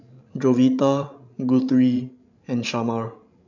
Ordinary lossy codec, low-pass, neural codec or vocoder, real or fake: none; 7.2 kHz; codec, 16 kHz, 16 kbps, FreqCodec, larger model; fake